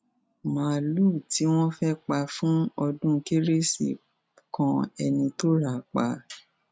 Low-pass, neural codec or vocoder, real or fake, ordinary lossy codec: none; none; real; none